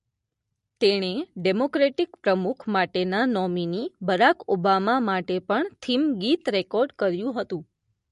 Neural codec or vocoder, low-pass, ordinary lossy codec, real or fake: none; 14.4 kHz; MP3, 48 kbps; real